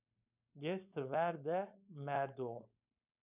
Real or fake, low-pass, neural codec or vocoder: fake; 3.6 kHz; codec, 16 kHz, 4.8 kbps, FACodec